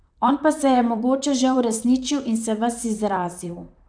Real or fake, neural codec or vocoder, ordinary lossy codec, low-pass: fake; vocoder, 44.1 kHz, 128 mel bands, Pupu-Vocoder; none; 9.9 kHz